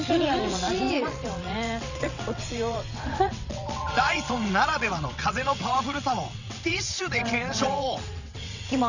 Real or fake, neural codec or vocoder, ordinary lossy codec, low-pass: fake; vocoder, 22.05 kHz, 80 mel bands, WaveNeXt; none; 7.2 kHz